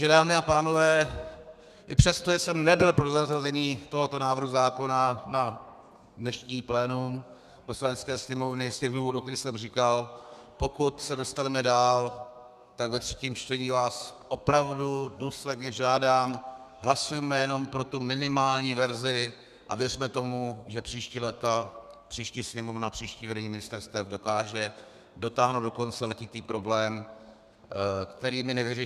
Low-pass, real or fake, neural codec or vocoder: 14.4 kHz; fake; codec, 32 kHz, 1.9 kbps, SNAC